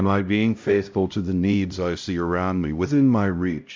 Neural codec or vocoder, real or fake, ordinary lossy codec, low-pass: codec, 16 kHz, 0.5 kbps, X-Codec, HuBERT features, trained on LibriSpeech; fake; MP3, 64 kbps; 7.2 kHz